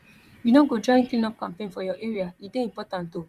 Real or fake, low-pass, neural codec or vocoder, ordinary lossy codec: fake; 14.4 kHz; vocoder, 44.1 kHz, 128 mel bands, Pupu-Vocoder; Opus, 64 kbps